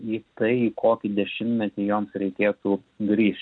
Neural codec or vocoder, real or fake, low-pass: none; real; 9.9 kHz